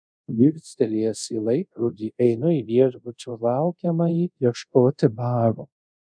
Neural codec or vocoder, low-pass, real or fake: codec, 24 kHz, 0.5 kbps, DualCodec; 9.9 kHz; fake